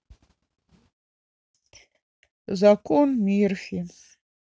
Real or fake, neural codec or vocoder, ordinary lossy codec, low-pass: real; none; none; none